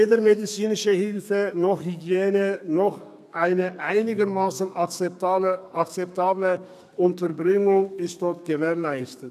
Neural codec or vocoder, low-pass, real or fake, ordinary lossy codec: codec, 32 kHz, 1.9 kbps, SNAC; 14.4 kHz; fake; none